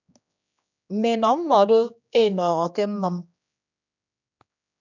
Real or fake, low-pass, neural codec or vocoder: fake; 7.2 kHz; codec, 16 kHz, 2 kbps, X-Codec, HuBERT features, trained on general audio